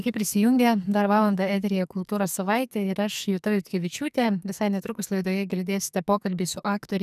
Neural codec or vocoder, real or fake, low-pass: codec, 44.1 kHz, 2.6 kbps, SNAC; fake; 14.4 kHz